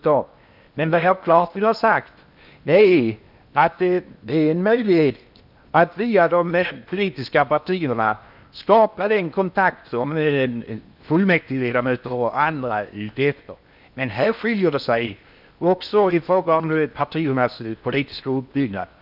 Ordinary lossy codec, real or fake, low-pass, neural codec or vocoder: none; fake; 5.4 kHz; codec, 16 kHz in and 24 kHz out, 0.8 kbps, FocalCodec, streaming, 65536 codes